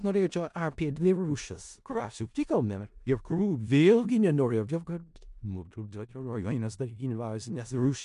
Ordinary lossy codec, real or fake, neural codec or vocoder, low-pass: MP3, 64 kbps; fake; codec, 16 kHz in and 24 kHz out, 0.4 kbps, LongCat-Audio-Codec, four codebook decoder; 10.8 kHz